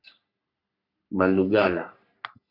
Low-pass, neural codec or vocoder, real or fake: 5.4 kHz; codec, 44.1 kHz, 3.4 kbps, Pupu-Codec; fake